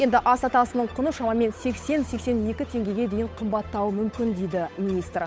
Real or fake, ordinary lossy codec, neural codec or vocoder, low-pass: fake; none; codec, 16 kHz, 8 kbps, FunCodec, trained on Chinese and English, 25 frames a second; none